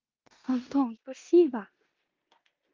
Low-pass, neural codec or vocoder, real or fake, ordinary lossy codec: 7.2 kHz; codec, 16 kHz in and 24 kHz out, 0.9 kbps, LongCat-Audio-Codec, four codebook decoder; fake; Opus, 32 kbps